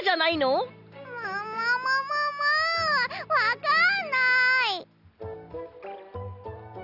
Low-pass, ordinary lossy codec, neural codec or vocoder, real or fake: 5.4 kHz; none; none; real